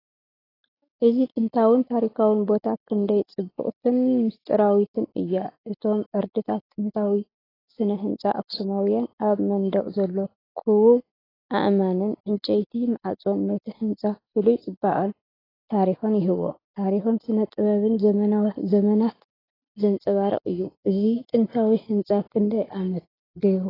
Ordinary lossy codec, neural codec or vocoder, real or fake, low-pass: AAC, 24 kbps; none; real; 5.4 kHz